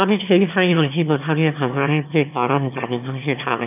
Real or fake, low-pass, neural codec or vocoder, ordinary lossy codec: fake; 3.6 kHz; autoencoder, 22.05 kHz, a latent of 192 numbers a frame, VITS, trained on one speaker; none